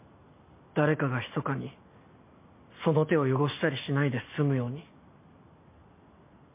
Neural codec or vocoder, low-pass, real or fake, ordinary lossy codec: none; 3.6 kHz; real; MP3, 24 kbps